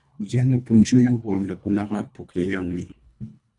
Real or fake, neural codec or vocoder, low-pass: fake; codec, 24 kHz, 1.5 kbps, HILCodec; 10.8 kHz